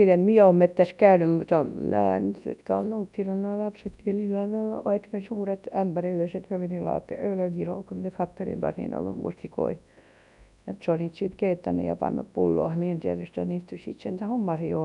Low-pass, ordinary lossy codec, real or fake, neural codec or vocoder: 10.8 kHz; none; fake; codec, 24 kHz, 0.9 kbps, WavTokenizer, large speech release